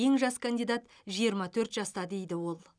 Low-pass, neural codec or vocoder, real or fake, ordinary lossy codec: 9.9 kHz; none; real; none